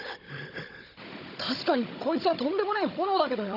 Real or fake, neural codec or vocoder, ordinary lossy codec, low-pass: fake; codec, 16 kHz, 16 kbps, FunCodec, trained on Chinese and English, 50 frames a second; none; 5.4 kHz